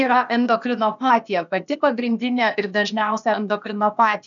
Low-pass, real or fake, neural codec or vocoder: 7.2 kHz; fake; codec, 16 kHz, 0.8 kbps, ZipCodec